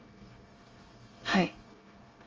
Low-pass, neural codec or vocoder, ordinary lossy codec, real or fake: 7.2 kHz; codec, 24 kHz, 1 kbps, SNAC; Opus, 32 kbps; fake